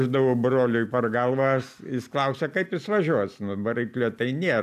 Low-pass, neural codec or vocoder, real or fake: 14.4 kHz; none; real